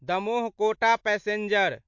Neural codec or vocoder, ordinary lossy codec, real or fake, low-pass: none; MP3, 48 kbps; real; 7.2 kHz